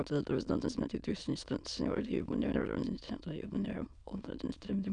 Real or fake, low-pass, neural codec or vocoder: fake; 9.9 kHz; autoencoder, 22.05 kHz, a latent of 192 numbers a frame, VITS, trained on many speakers